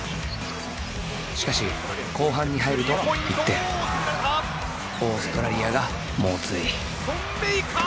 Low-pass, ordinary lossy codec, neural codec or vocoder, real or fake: none; none; none; real